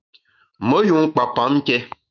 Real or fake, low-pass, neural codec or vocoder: fake; 7.2 kHz; autoencoder, 48 kHz, 128 numbers a frame, DAC-VAE, trained on Japanese speech